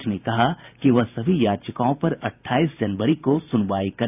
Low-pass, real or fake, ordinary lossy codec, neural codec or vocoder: 3.6 kHz; fake; none; vocoder, 44.1 kHz, 128 mel bands every 512 samples, BigVGAN v2